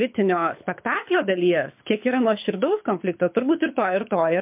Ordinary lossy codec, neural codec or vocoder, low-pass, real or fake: MP3, 32 kbps; codec, 24 kHz, 6 kbps, HILCodec; 3.6 kHz; fake